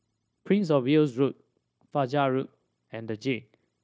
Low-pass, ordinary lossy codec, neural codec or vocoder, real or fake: none; none; codec, 16 kHz, 0.9 kbps, LongCat-Audio-Codec; fake